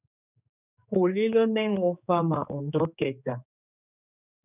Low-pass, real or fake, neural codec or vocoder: 3.6 kHz; fake; codec, 16 kHz, 4 kbps, X-Codec, HuBERT features, trained on general audio